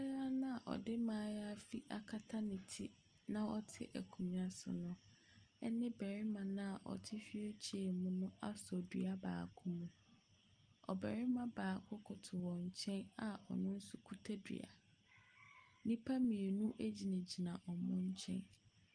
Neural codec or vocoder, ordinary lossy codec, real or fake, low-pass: none; Opus, 32 kbps; real; 9.9 kHz